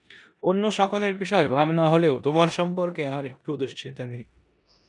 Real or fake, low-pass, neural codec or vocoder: fake; 10.8 kHz; codec, 16 kHz in and 24 kHz out, 0.9 kbps, LongCat-Audio-Codec, four codebook decoder